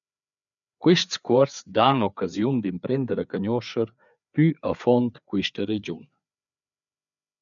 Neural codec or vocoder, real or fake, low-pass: codec, 16 kHz, 4 kbps, FreqCodec, larger model; fake; 7.2 kHz